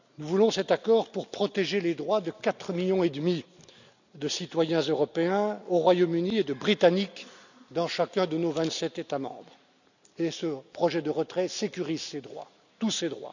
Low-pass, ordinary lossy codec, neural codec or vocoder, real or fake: 7.2 kHz; none; none; real